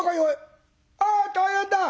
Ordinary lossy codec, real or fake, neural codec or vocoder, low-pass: none; real; none; none